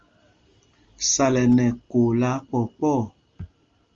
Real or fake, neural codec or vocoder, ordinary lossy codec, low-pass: real; none; Opus, 32 kbps; 7.2 kHz